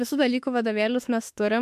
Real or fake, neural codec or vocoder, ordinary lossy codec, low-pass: fake; autoencoder, 48 kHz, 32 numbers a frame, DAC-VAE, trained on Japanese speech; MP3, 64 kbps; 14.4 kHz